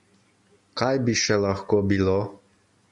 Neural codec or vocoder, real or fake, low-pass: none; real; 10.8 kHz